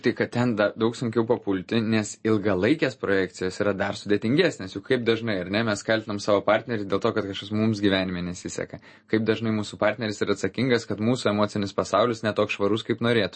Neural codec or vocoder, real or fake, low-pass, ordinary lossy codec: none; real; 9.9 kHz; MP3, 32 kbps